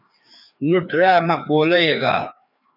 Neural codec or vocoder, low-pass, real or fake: codec, 16 kHz, 2 kbps, FreqCodec, larger model; 5.4 kHz; fake